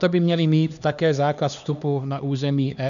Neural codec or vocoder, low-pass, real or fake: codec, 16 kHz, 2 kbps, X-Codec, HuBERT features, trained on LibriSpeech; 7.2 kHz; fake